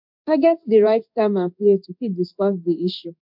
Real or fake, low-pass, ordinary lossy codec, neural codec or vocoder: fake; 5.4 kHz; MP3, 48 kbps; codec, 16 kHz in and 24 kHz out, 1 kbps, XY-Tokenizer